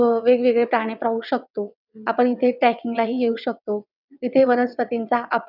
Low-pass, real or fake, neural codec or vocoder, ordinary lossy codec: 5.4 kHz; fake; vocoder, 22.05 kHz, 80 mel bands, WaveNeXt; none